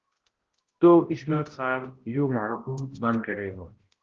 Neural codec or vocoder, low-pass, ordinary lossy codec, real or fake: codec, 16 kHz, 0.5 kbps, X-Codec, HuBERT features, trained on balanced general audio; 7.2 kHz; Opus, 16 kbps; fake